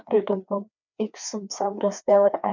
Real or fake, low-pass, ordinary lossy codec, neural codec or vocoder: fake; 7.2 kHz; none; codec, 16 kHz, 2 kbps, FreqCodec, larger model